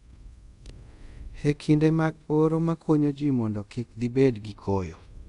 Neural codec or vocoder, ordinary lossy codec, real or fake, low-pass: codec, 24 kHz, 0.5 kbps, DualCodec; none; fake; 10.8 kHz